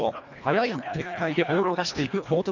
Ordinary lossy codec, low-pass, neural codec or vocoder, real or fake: none; 7.2 kHz; codec, 24 kHz, 1.5 kbps, HILCodec; fake